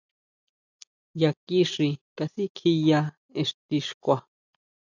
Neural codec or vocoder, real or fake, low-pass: none; real; 7.2 kHz